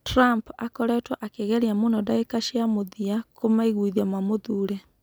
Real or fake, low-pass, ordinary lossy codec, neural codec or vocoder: real; none; none; none